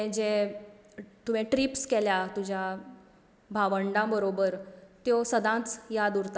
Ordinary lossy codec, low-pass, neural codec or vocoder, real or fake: none; none; none; real